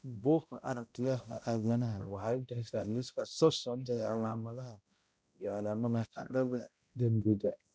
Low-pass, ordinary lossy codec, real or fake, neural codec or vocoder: none; none; fake; codec, 16 kHz, 0.5 kbps, X-Codec, HuBERT features, trained on balanced general audio